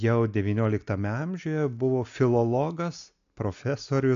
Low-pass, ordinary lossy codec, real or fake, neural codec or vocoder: 7.2 kHz; AAC, 64 kbps; real; none